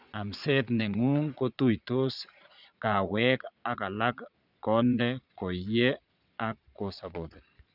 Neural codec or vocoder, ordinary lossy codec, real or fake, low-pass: vocoder, 22.05 kHz, 80 mel bands, Vocos; none; fake; 5.4 kHz